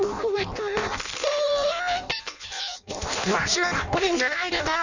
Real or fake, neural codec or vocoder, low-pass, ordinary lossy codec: fake; codec, 16 kHz in and 24 kHz out, 0.6 kbps, FireRedTTS-2 codec; 7.2 kHz; none